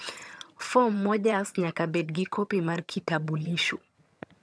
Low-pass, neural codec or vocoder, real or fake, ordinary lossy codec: none; vocoder, 22.05 kHz, 80 mel bands, HiFi-GAN; fake; none